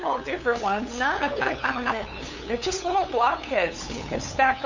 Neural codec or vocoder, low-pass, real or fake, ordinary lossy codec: codec, 16 kHz, 8 kbps, FunCodec, trained on LibriTTS, 25 frames a second; 7.2 kHz; fake; Opus, 64 kbps